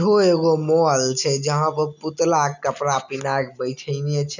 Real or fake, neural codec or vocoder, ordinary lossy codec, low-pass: real; none; none; 7.2 kHz